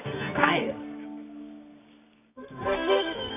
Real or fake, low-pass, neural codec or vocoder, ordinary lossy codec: fake; 3.6 kHz; codec, 24 kHz, 0.9 kbps, WavTokenizer, medium music audio release; none